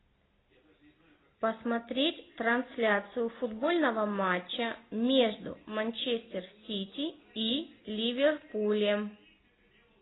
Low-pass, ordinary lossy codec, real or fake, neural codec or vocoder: 7.2 kHz; AAC, 16 kbps; real; none